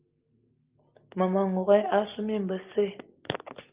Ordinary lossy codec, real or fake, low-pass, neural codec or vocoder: Opus, 24 kbps; real; 3.6 kHz; none